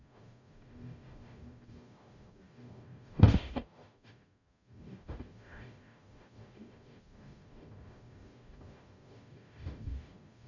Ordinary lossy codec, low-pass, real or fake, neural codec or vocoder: none; 7.2 kHz; fake; codec, 44.1 kHz, 0.9 kbps, DAC